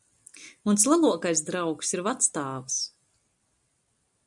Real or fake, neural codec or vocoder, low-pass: real; none; 10.8 kHz